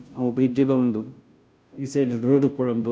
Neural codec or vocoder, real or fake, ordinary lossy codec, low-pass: codec, 16 kHz, 0.5 kbps, FunCodec, trained on Chinese and English, 25 frames a second; fake; none; none